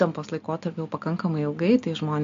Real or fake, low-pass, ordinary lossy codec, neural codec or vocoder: real; 7.2 kHz; AAC, 64 kbps; none